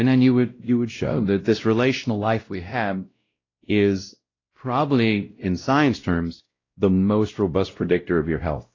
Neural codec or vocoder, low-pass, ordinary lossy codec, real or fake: codec, 16 kHz, 0.5 kbps, X-Codec, WavLM features, trained on Multilingual LibriSpeech; 7.2 kHz; AAC, 32 kbps; fake